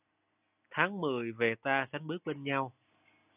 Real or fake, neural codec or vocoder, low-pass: real; none; 3.6 kHz